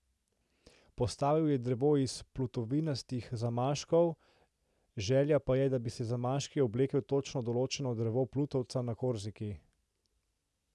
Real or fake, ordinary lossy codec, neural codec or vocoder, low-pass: real; none; none; none